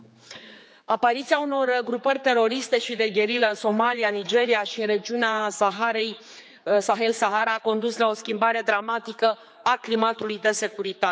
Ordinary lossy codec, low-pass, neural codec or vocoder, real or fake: none; none; codec, 16 kHz, 4 kbps, X-Codec, HuBERT features, trained on general audio; fake